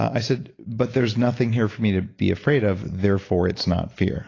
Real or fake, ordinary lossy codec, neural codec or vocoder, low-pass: real; AAC, 32 kbps; none; 7.2 kHz